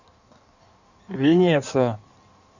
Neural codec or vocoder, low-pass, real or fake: codec, 16 kHz in and 24 kHz out, 1.1 kbps, FireRedTTS-2 codec; 7.2 kHz; fake